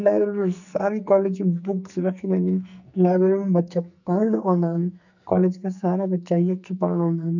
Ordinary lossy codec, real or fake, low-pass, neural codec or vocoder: none; fake; 7.2 kHz; codec, 44.1 kHz, 2.6 kbps, SNAC